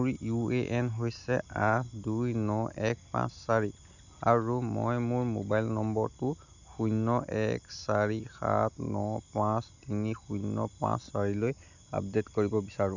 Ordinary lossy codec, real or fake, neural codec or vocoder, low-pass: none; real; none; 7.2 kHz